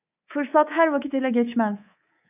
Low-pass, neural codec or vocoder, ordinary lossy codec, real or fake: 3.6 kHz; codec, 24 kHz, 3.1 kbps, DualCodec; AAC, 32 kbps; fake